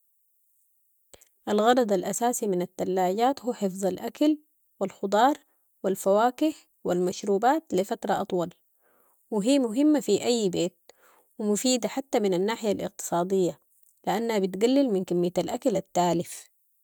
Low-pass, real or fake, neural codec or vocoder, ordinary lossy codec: none; real; none; none